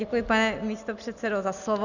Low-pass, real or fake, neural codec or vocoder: 7.2 kHz; real; none